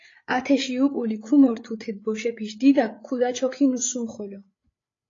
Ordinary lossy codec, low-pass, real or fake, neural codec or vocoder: AAC, 32 kbps; 7.2 kHz; fake; codec, 16 kHz, 8 kbps, FreqCodec, larger model